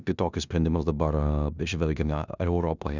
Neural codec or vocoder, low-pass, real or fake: codec, 16 kHz in and 24 kHz out, 0.9 kbps, LongCat-Audio-Codec, four codebook decoder; 7.2 kHz; fake